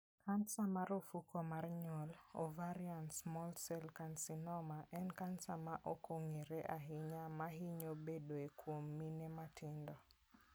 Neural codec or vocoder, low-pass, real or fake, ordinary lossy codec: none; none; real; none